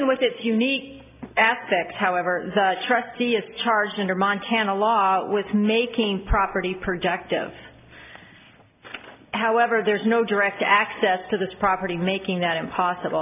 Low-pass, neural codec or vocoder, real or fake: 3.6 kHz; none; real